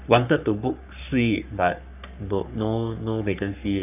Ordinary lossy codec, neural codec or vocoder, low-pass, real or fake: none; codec, 44.1 kHz, 3.4 kbps, Pupu-Codec; 3.6 kHz; fake